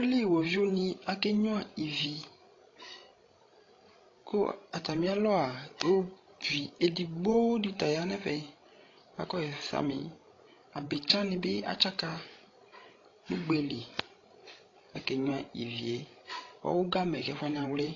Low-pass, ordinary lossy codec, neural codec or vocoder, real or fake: 7.2 kHz; AAC, 32 kbps; codec, 16 kHz, 16 kbps, FreqCodec, larger model; fake